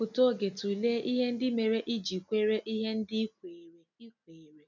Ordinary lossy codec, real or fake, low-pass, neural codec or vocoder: none; real; 7.2 kHz; none